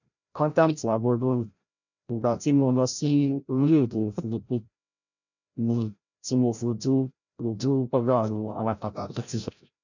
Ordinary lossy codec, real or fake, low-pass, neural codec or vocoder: none; fake; 7.2 kHz; codec, 16 kHz, 0.5 kbps, FreqCodec, larger model